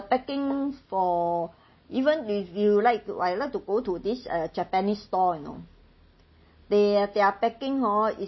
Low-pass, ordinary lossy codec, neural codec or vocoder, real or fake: 7.2 kHz; MP3, 24 kbps; none; real